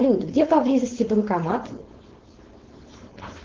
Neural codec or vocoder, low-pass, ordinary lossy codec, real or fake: codec, 16 kHz, 4.8 kbps, FACodec; 7.2 kHz; Opus, 16 kbps; fake